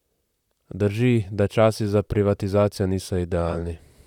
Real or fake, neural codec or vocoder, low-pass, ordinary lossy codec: fake; vocoder, 44.1 kHz, 128 mel bands, Pupu-Vocoder; 19.8 kHz; none